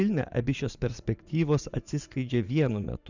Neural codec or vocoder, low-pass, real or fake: vocoder, 44.1 kHz, 80 mel bands, Vocos; 7.2 kHz; fake